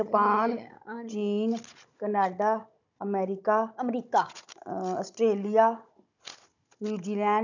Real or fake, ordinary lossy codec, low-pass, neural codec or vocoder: fake; none; 7.2 kHz; codec, 16 kHz, 16 kbps, FunCodec, trained on Chinese and English, 50 frames a second